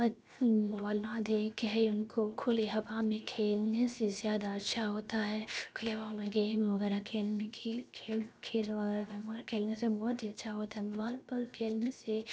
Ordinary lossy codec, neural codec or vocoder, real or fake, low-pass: none; codec, 16 kHz, 0.7 kbps, FocalCodec; fake; none